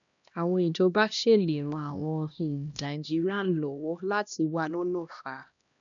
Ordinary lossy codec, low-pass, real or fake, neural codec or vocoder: none; 7.2 kHz; fake; codec, 16 kHz, 1 kbps, X-Codec, HuBERT features, trained on LibriSpeech